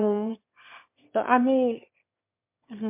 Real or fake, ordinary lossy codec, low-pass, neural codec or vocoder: fake; MP3, 32 kbps; 3.6 kHz; autoencoder, 22.05 kHz, a latent of 192 numbers a frame, VITS, trained on one speaker